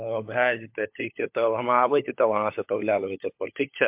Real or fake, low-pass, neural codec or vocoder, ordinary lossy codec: fake; 3.6 kHz; codec, 16 kHz, 16 kbps, FunCodec, trained on LibriTTS, 50 frames a second; MP3, 32 kbps